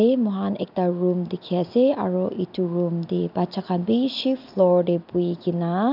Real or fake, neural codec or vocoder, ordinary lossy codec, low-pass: real; none; none; 5.4 kHz